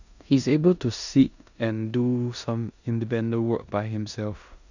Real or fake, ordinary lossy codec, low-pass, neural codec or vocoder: fake; none; 7.2 kHz; codec, 16 kHz in and 24 kHz out, 0.9 kbps, LongCat-Audio-Codec, four codebook decoder